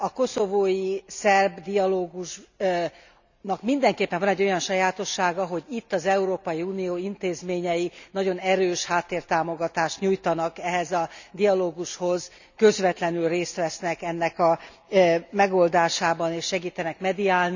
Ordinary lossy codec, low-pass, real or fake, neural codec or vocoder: none; 7.2 kHz; real; none